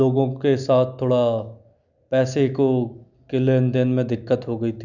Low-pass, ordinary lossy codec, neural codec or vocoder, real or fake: 7.2 kHz; none; none; real